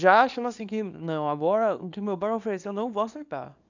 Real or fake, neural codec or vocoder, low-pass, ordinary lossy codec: fake; codec, 24 kHz, 0.9 kbps, WavTokenizer, small release; 7.2 kHz; none